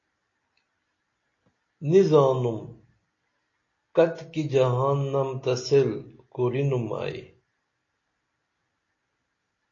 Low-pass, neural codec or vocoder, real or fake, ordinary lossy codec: 7.2 kHz; none; real; AAC, 32 kbps